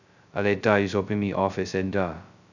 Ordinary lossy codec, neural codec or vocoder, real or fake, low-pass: none; codec, 16 kHz, 0.2 kbps, FocalCodec; fake; 7.2 kHz